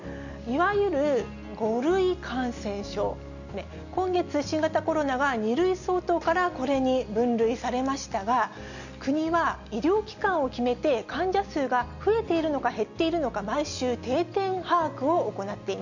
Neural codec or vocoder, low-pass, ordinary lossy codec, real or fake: none; 7.2 kHz; none; real